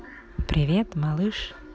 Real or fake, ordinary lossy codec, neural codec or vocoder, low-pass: real; none; none; none